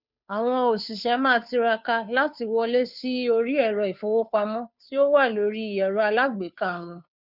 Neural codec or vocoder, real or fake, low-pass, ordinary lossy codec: codec, 16 kHz, 2 kbps, FunCodec, trained on Chinese and English, 25 frames a second; fake; 5.4 kHz; none